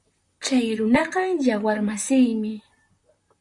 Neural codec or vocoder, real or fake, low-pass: vocoder, 44.1 kHz, 128 mel bands, Pupu-Vocoder; fake; 10.8 kHz